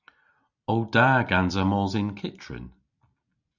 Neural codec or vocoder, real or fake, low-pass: none; real; 7.2 kHz